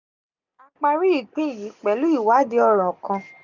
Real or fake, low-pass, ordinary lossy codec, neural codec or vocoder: real; 7.2 kHz; none; none